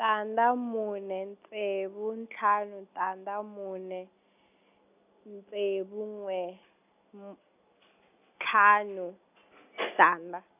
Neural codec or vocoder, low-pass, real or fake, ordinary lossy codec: none; 3.6 kHz; real; none